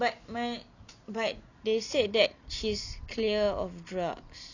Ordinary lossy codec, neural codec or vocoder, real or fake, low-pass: none; none; real; 7.2 kHz